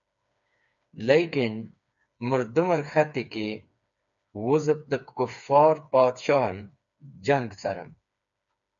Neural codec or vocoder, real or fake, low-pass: codec, 16 kHz, 4 kbps, FreqCodec, smaller model; fake; 7.2 kHz